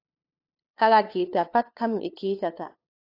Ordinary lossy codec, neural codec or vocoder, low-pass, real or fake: AAC, 32 kbps; codec, 16 kHz, 2 kbps, FunCodec, trained on LibriTTS, 25 frames a second; 5.4 kHz; fake